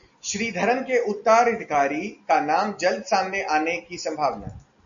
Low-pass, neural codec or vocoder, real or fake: 7.2 kHz; none; real